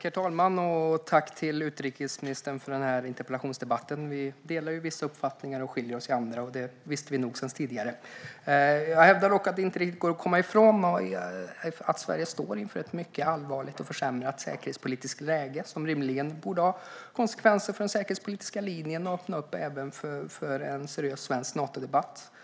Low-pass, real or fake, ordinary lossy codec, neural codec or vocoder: none; real; none; none